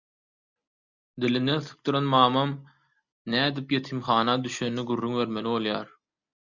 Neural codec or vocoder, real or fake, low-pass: none; real; 7.2 kHz